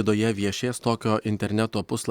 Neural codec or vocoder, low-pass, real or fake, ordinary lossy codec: none; 19.8 kHz; real; Opus, 64 kbps